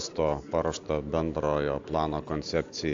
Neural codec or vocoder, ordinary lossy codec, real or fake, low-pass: none; AAC, 64 kbps; real; 7.2 kHz